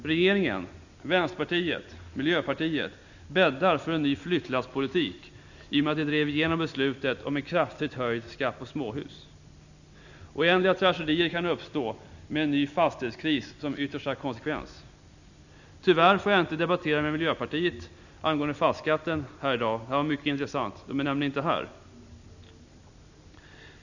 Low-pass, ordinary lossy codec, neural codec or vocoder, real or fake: 7.2 kHz; none; none; real